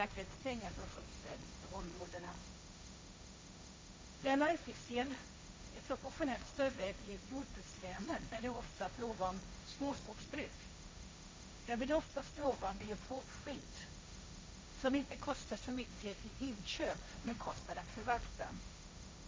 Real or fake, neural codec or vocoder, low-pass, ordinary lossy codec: fake; codec, 16 kHz, 1.1 kbps, Voila-Tokenizer; none; none